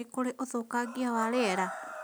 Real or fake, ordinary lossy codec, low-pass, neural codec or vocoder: real; none; none; none